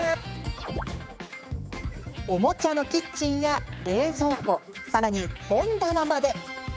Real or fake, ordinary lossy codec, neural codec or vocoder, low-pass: fake; none; codec, 16 kHz, 4 kbps, X-Codec, HuBERT features, trained on general audio; none